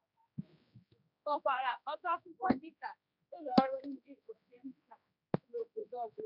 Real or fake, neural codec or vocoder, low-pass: fake; codec, 16 kHz, 1 kbps, X-Codec, HuBERT features, trained on general audio; 5.4 kHz